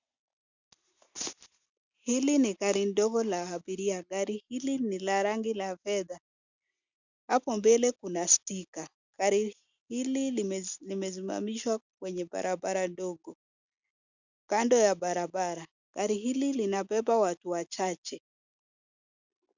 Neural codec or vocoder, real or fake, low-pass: none; real; 7.2 kHz